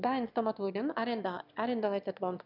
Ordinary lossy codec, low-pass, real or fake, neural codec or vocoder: none; 5.4 kHz; fake; autoencoder, 22.05 kHz, a latent of 192 numbers a frame, VITS, trained on one speaker